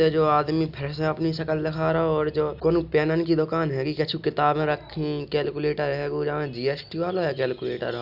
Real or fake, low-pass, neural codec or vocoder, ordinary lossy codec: real; 5.4 kHz; none; none